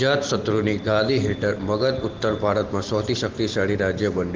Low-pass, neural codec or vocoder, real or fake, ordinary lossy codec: 7.2 kHz; none; real; Opus, 16 kbps